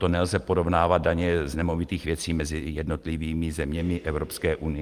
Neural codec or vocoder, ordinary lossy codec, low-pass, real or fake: none; Opus, 32 kbps; 14.4 kHz; real